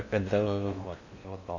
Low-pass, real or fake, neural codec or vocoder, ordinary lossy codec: 7.2 kHz; fake; codec, 16 kHz in and 24 kHz out, 0.8 kbps, FocalCodec, streaming, 65536 codes; none